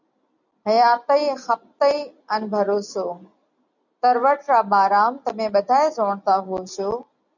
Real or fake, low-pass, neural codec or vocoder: real; 7.2 kHz; none